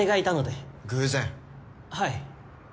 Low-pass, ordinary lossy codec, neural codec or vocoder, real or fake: none; none; none; real